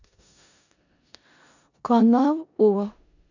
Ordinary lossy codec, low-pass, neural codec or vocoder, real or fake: none; 7.2 kHz; codec, 16 kHz in and 24 kHz out, 0.4 kbps, LongCat-Audio-Codec, four codebook decoder; fake